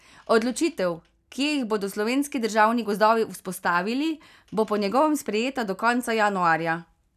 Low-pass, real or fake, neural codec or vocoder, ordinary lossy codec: 14.4 kHz; real; none; none